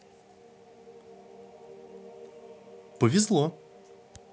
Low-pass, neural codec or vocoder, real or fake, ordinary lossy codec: none; none; real; none